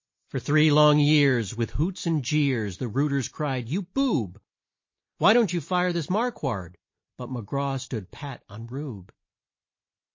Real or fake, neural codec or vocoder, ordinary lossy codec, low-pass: real; none; MP3, 32 kbps; 7.2 kHz